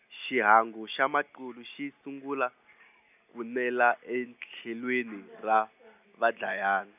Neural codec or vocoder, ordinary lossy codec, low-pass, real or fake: none; none; 3.6 kHz; real